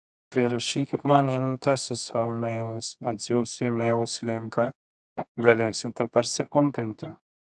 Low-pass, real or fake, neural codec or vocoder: 10.8 kHz; fake; codec, 24 kHz, 0.9 kbps, WavTokenizer, medium music audio release